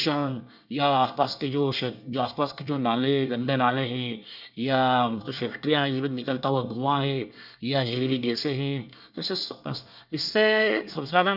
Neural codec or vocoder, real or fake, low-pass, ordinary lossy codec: codec, 24 kHz, 1 kbps, SNAC; fake; 5.4 kHz; none